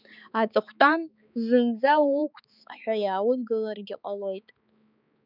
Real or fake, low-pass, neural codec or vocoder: fake; 5.4 kHz; codec, 16 kHz, 4 kbps, X-Codec, HuBERT features, trained on balanced general audio